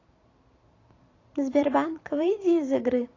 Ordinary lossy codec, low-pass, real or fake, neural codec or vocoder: AAC, 32 kbps; 7.2 kHz; fake; vocoder, 44.1 kHz, 128 mel bands every 256 samples, BigVGAN v2